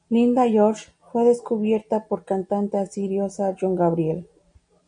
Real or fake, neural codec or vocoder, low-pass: real; none; 9.9 kHz